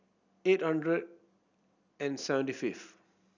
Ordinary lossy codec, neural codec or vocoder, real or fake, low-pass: none; none; real; 7.2 kHz